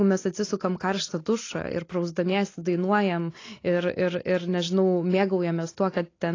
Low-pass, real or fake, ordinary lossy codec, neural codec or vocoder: 7.2 kHz; real; AAC, 32 kbps; none